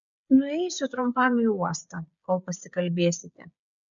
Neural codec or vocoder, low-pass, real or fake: codec, 16 kHz, 8 kbps, FreqCodec, smaller model; 7.2 kHz; fake